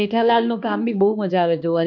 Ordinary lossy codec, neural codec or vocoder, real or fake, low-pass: none; codec, 16 kHz, 2 kbps, X-Codec, HuBERT features, trained on balanced general audio; fake; 7.2 kHz